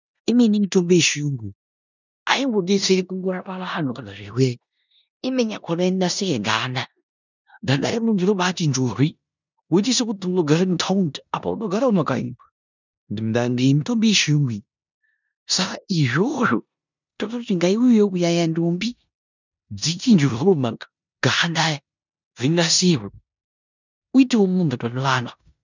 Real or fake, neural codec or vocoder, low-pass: fake; codec, 16 kHz in and 24 kHz out, 0.9 kbps, LongCat-Audio-Codec, four codebook decoder; 7.2 kHz